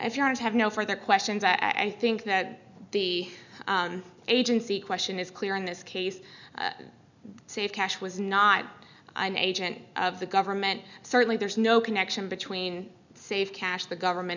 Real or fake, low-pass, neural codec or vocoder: real; 7.2 kHz; none